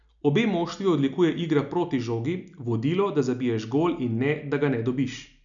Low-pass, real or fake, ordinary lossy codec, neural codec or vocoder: 7.2 kHz; real; none; none